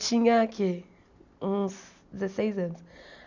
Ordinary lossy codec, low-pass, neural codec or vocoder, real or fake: none; 7.2 kHz; none; real